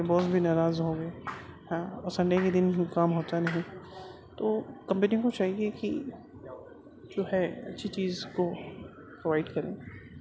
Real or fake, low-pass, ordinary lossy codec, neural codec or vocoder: real; none; none; none